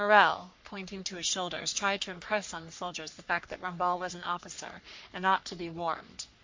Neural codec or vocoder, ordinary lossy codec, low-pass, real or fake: codec, 44.1 kHz, 3.4 kbps, Pupu-Codec; MP3, 48 kbps; 7.2 kHz; fake